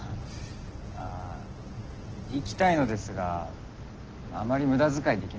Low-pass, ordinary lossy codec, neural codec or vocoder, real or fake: 7.2 kHz; Opus, 16 kbps; none; real